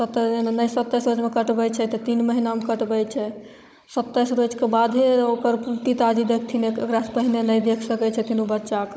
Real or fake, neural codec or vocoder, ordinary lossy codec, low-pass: fake; codec, 16 kHz, 16 kbps, FunCodec, trained on Chinese and English, 50 frames a second; none; none